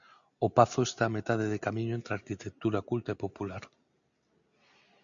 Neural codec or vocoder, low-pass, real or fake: none; 7.2 kHz; real